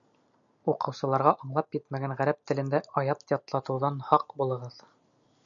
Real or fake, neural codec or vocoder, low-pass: real; none; 7.2 kHz